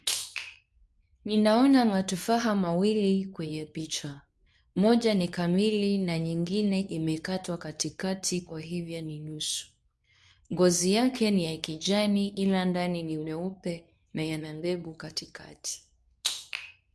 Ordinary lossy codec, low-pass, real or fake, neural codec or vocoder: none; none; fake; codec, 24 kHz, 0.9 kbps, WavTokenizer, medium speech release version 2